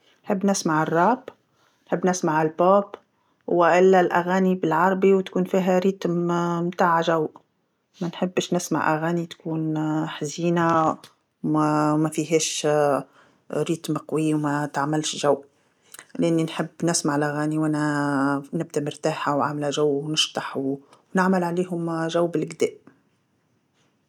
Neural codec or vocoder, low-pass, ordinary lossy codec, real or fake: none; 19.8 kHz; none; real